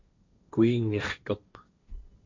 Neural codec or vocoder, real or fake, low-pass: codec, 16 kHz, 1.1 kbps, Voila-Tokenizer; fake; 7.2 kHz